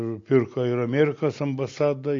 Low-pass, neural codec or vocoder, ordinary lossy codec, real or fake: 7.2 kHz; none; AAC, 48 kbps; real